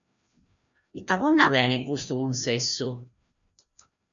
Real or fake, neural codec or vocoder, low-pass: fake; codec, 16 kHz, 1 kbps, FreqCodec, larger model; 7.2 kHz